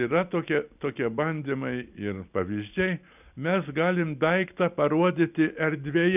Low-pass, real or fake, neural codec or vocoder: 3.6 kHz; real; none